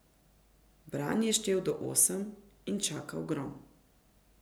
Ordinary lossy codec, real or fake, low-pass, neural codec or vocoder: none; real; none; none